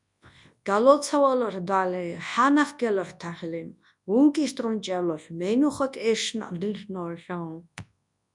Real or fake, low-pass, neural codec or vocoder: fake; 10.8 kHz; codec, 24 kHz, 0.9 kbps, WavTokenizer, large speech release